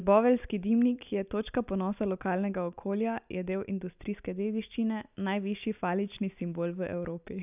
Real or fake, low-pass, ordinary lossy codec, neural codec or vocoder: real; 3.6 kHz; none; none